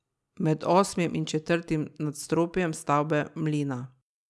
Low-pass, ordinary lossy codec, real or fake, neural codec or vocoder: none; none; real; none